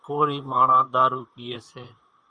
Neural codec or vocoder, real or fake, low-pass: vocoder, 44.1 kHz, 128 mel bands, Pupu-Vocoder; fake; 9.9 kHz